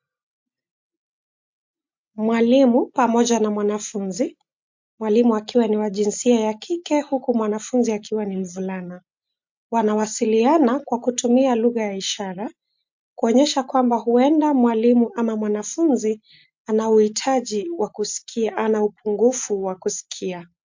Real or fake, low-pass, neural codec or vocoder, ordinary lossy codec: real; 7.2 kHz; none; MP3, 48 kbps